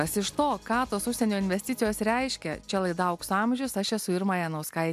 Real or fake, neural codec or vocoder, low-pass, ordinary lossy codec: real; none; 14.4 kHz; MP3, 96 kbps